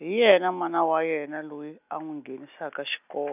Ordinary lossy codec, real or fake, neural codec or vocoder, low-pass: none; real; none; 3.6 kHz